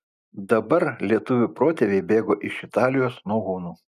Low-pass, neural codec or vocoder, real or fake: 14.4 kHz; none; real